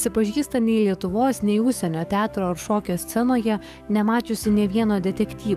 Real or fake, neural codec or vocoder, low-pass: fake; codec, 44.1 kHz, 7.8 kbps, DAC; 14.4 kHz